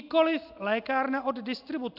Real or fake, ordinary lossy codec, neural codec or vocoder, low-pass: real; AAC, 48 kbps; none; 5.4 kHz